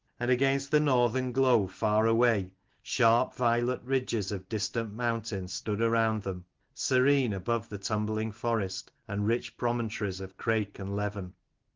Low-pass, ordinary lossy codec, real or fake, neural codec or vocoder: 7.2 kHz; Opus, 16 kbps; real; none